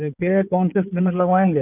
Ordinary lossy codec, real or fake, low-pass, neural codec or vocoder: none; fake; 3.6 kHz; codec, 16 kHz in and 24 kHz out, 2.2 kbps, FireRedTTS-2 codec